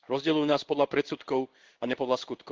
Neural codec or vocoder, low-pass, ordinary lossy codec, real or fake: codec, 16 kHz in and 24 kHz out, 1 kbps, XY-Tokenizer; 7.2 kHz; Opus, 16 kbps; fake